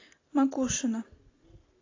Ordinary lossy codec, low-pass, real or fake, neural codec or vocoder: AAC, 32 kbps; 7.2 kHz; real; none